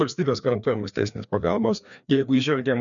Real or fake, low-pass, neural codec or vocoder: fake; 7.2 kHz; codec, 16 kHz, 2 kbps, FreqCodec, larger model